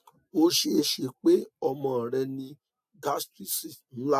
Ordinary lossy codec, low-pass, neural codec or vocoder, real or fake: AAC, 96 kbps; 14.4 kHz; none; real